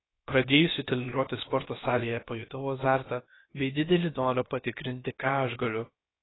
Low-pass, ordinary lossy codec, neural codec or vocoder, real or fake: 7.2 kHz; AAC, 16 kbps; codec, 16 kHz, about 1 kbps, DyCAST, with the encoder's durations; fake